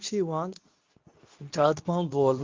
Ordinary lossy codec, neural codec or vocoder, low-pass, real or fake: Opus, 24 kbps; codec, 24 kHz, 0.9 kbps, WavTokenizer, medium speech release version 2; 7.2 kHz; fake